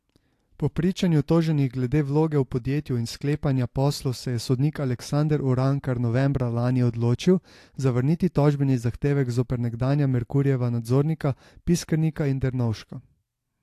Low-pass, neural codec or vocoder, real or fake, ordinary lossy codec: 14.4 kHz; none; real; AAC, 64 kbps